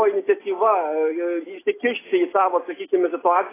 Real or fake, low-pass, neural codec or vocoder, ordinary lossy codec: real; 3.6 kHz; none; AAC, 16 kbps